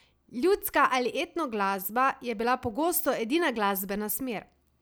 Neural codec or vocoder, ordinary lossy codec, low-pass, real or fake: none; none; none; real